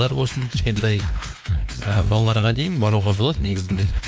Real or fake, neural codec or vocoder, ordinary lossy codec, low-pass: fake; codec, 16 kHz, 2 kbps, X-Codec, WavLM features, trained on Multilingual LibriSpeech; none; none